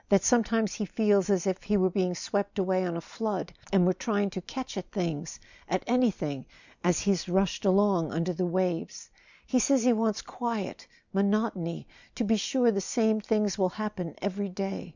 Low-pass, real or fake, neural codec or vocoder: 7.2 kHz; real; none